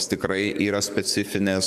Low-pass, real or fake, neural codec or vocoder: 14.4 kHz; fake; codec, 44.1 kHz, 7.8 kbps, DAC